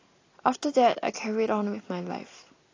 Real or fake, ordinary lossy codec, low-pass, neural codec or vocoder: real; AAC, 32 kbps; 7.2 kHz; none